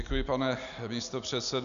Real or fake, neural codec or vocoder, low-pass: real; none; 7.2 kHz